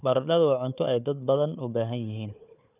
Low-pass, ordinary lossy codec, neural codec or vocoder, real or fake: 3.6 kHz; none; codec, 16 kHz, 4 kbps, FunCodec, trained on Chinese and English, 50 frames a second; fake